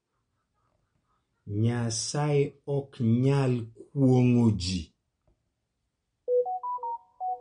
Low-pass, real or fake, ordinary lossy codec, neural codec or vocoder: 9.9 kHz; real; MP3, 48 kbps; none